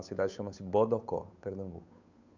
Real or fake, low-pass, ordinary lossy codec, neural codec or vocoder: fake; 7.2 kHz; MP3, 64 kbps; codec, 16 kHz, 8 kbps, FunCodec, trained on Chinese and English, 25 frames a second